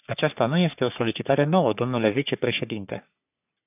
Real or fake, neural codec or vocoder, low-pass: fake; codec, 44.1 kHz, 3.4 kbps, Pupu-Codec; 3.6 kHz